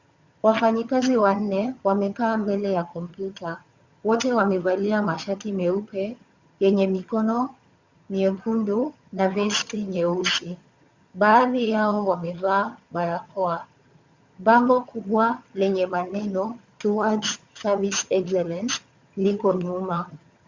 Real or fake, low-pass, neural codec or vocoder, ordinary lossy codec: fake; 7.2 kHz; vocoder, 22.05 kHz, 80 mel bands, HiFi-GAN; Opus, 64 kbps